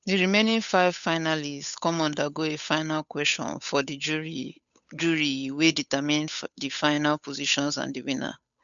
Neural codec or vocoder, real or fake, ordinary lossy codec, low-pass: codec, 16 kHz, 8 kbps, FunCodec, trained on Chinese and English, 25 frames a second; fake; none; 7.2 kHz